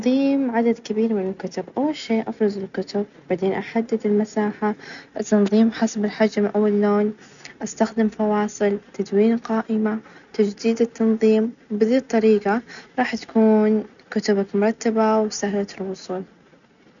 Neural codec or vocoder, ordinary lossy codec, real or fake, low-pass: none; none; real; 7.2 kHz